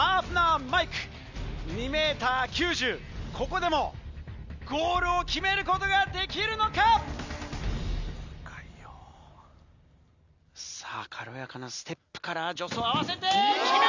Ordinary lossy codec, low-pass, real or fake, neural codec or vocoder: Opus, 64 kbps; 7.2 kHz; real; none